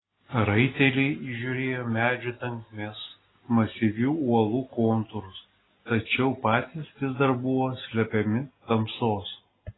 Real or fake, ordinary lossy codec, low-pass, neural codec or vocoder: real; AAC, 16 kbps; 7.2 kHz; none